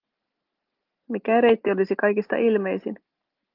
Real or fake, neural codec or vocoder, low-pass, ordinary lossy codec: real; none; 5.4 kHz; Opus, 24 kbps